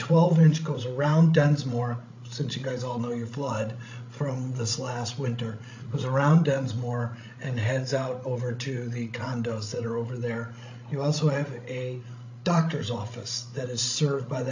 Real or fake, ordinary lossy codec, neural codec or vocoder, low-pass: fake; AAC, 48 kbps; codec, 16 kHz, 16 kbps, FreqCodec, larger model; 7.2 kHz